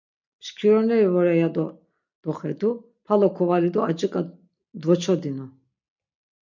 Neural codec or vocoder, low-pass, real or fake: none; 7.2 kHz; real